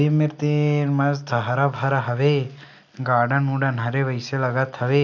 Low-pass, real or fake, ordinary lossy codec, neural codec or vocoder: 7.2 kHz; real; none; none